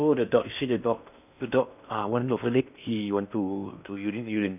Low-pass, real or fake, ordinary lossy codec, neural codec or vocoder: 3.6 kHz; fake; none; codec, 16 kHz in and 24 kHz out, 0.6 kbps, FocalCodec, streaming, 4096 codes